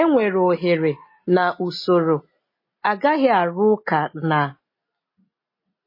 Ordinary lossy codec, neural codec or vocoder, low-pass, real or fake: MP3, 24 kbps; none; 5.4 kHz; real